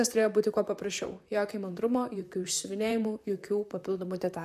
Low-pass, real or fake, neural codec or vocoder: 14.4 kHz; fake; vocoder, 44.1 kHz, 128 mel bands, Pupu-Vocoder